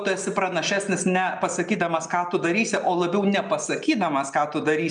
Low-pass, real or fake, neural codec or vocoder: 9.9 kHz; real; none